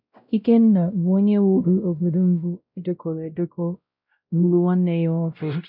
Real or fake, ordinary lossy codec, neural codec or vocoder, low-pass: fake; none; codec, 16 kHz, 0.5 kbps, X-Codec, WavLM features, trained on Multilingual LibriSpeech; 5.4 kHz